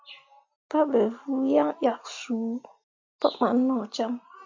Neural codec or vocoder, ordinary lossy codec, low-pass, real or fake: none; MP3, 48 kbps; 7.2 kHz; real